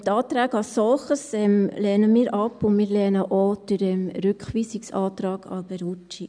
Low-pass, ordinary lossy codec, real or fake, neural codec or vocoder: 9.9 kHz; none; real; none